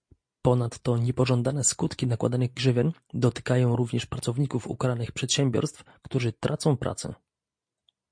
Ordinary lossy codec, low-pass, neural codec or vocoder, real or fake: MP3, 48 kbps; 9.9 kHz; none; real